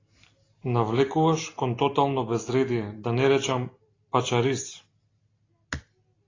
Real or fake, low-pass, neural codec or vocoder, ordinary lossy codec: real; 7.2 kHz; none; AAC, 32 kbps